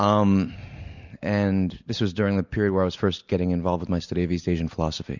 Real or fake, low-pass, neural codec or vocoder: real; 7.2 kHz; none